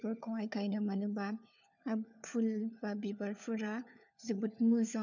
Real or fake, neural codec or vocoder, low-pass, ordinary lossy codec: fake; codec, 16 kHz, 4 kbps, FunCodec, trained on LibriTTS, 50 frames a second; 7.2 kHz; none